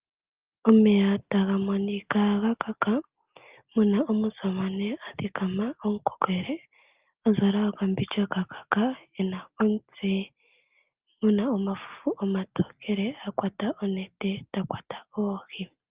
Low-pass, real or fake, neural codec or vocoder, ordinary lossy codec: 3.6 kHz; real; none; Opus, 32 kbps